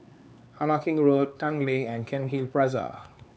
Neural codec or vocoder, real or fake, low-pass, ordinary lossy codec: codec, 16 kHz, 4 kbps, X-Codec, HuBERT features, trained on LibriSpeech; fake; none; none